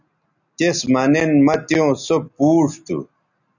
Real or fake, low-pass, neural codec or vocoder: real; 7.2 kHz; none